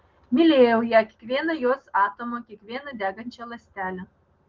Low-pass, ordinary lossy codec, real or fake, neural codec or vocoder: 7.2 kHz; Opus, 16 kbps; real; none